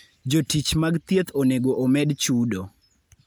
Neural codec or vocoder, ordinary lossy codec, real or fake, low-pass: none; none; real; none